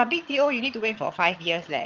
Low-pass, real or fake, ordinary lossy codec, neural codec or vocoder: 7.2 kHz; fake; Opus, 32 kbps; vocoder, 22.05 kHz, 80 mel bands, HiFi-GAN